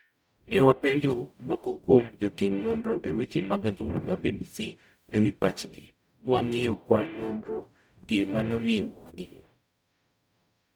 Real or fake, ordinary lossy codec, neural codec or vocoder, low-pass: fake; none; codec, 44.1 kHz, 0.9 kbps, DAC; none